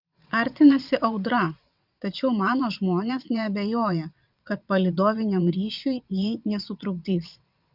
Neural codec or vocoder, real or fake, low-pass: vocoder, 22.05 kHz, 80 mel bands, WaveNeXt; fake; 5.4 kHz